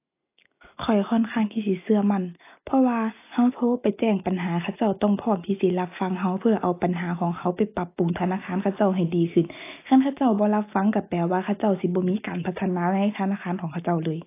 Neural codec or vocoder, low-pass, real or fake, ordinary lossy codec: none; 3.6 kHz; real; AAC, 24 kbps